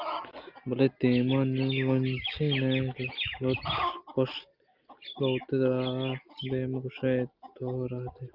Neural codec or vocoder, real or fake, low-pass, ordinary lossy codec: none; real; 5.4 kHz; Opus, 32 kbps